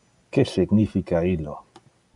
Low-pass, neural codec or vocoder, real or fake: 10.8 kHz; none; real